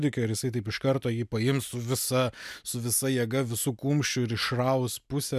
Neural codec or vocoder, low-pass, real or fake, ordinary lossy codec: none; 14.4 kHz; real; MP3, 96 kbps